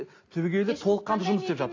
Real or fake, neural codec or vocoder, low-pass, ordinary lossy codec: real; none; 7.2 kHz; AAC, 32 kbps